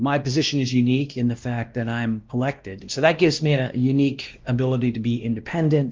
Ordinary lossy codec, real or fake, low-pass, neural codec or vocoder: Opus, 32 kbps; fake; 7.2 kHz; codec, 16 kHz, 1 kbps, X-Codec, WavLM features, trained on Multilingual LibriSpeech